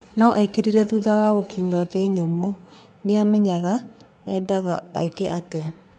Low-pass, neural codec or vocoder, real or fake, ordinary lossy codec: 10.8 kHz; codec, 24 kHz, 1 kbps, SNAC; fake; none